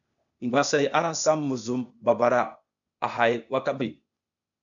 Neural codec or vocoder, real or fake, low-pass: codec, 16 kHz, 0.8 kbps, ZipCodec; fake; 7.2 kHz